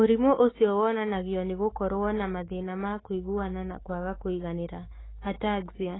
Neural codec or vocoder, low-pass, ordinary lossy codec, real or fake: autoencoder, 48 kHz, 128 numbers a frame, DAC-VAE, trained on Japanese speech; 7.2 kHz; AAC, 16 kbps; fake